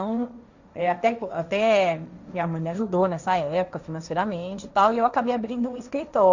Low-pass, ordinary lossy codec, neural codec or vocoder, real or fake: 7.2 kHz; Opus, 64 kbps; codec, 16 kHz, 1.1 kbps, Voila-Tokenizer; fake